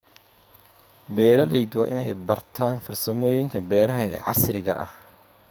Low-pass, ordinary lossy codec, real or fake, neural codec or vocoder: none; none; fake; codec, 44.1 kHz, 2.6 kbps, SNAC